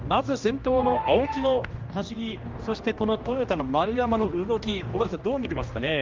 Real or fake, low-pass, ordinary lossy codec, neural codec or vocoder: fake; 7.2 kHz; Opus, 32 kbps; codec, 16 kHz, 1 kbps, X-Codec, HuBERT features, trained on general audio